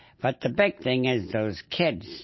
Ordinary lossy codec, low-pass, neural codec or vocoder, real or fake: MP3, 24 kbps; 7.2 kHz; none; real